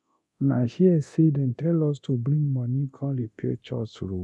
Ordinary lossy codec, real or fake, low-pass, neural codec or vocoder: none; fake; none; codec, 24 kHz, 0.9 kbps, DualCodec